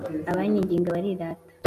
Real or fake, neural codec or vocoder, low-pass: real; none; 14.4 kHz